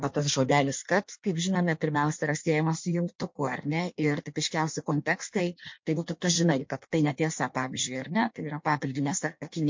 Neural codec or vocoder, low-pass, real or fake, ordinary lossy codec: codec, 16 kHz in and 24 kHz out, 1.1 kbps, FireRedTTS-2 codec; 7.2 kHz; fake; MP3, 48 kbps